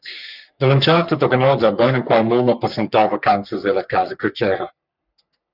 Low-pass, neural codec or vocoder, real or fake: 5.4 kHz; codec, 44.1 kHz, 3.4 kbps, Pupu-Codec; fake